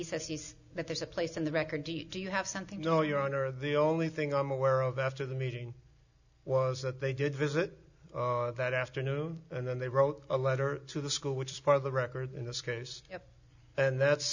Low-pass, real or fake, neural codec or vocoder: 7.2 kHz; real; none